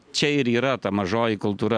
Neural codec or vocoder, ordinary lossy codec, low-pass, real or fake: none; MP3, 96 kbps; 9.9 kHz; real